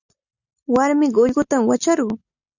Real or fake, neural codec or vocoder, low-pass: real; none; 7.2 kHz